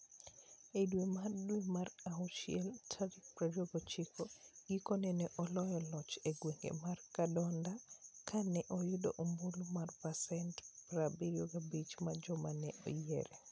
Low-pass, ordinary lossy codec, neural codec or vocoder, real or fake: none; none; none; real